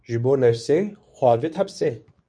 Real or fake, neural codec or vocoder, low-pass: fake; codec, 24 kHz, 0.9 kbps, WavTokenizer, medium speech release version 2; 9.9 kHz